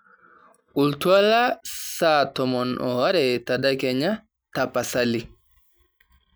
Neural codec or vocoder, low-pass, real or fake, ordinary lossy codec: none; none; real; none